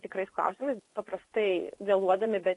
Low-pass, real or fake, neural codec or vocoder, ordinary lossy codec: 10.8 kHz; fake; vocoder, 24 kHz, 100 mel bands, Vocos; AAC, 48 kbps